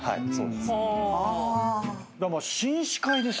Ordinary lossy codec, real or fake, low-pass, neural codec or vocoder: none; real; none; none